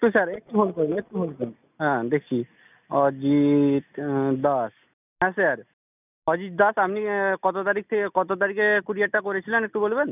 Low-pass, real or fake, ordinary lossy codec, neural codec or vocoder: 3.6 kHz; real; none; none